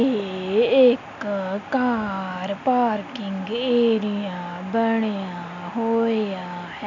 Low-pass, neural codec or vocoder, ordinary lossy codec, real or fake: 7.2 kHz; none; none; real